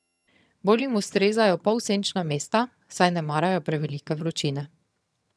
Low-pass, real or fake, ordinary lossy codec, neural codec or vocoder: none; fake; none; vocoder, 22.05 kHz, 80 mel bands, HiFi-GAN